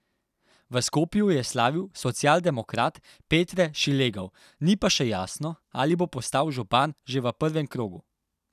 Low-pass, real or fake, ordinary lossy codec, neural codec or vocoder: 14.4 kHz; real; none; none